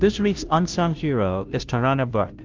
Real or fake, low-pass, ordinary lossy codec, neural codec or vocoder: fake; 7.2 kHz; Opus, 24 kbps; codec, 16 kHz, 0.5 kbps, FunCodec, trained on Chinese and English, 25 frames a second